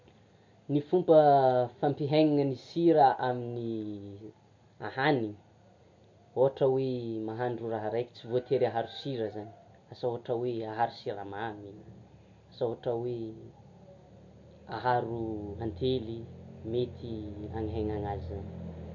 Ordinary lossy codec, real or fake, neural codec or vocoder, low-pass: MP3, 48 kbps; real; none; 7.2 kHz